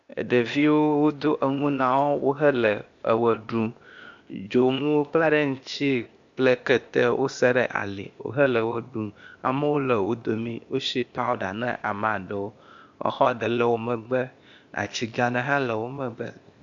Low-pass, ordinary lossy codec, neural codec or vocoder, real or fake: 7.2 kHz; AAC, 64 kbps; codec, 16 kHz, 0.8 kbps, ZipCodec; fake